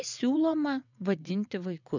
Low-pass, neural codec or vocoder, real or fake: 7.2 kHz; vocoder, 22.05 kHz, 80 mel bands, WaveNeXt; fake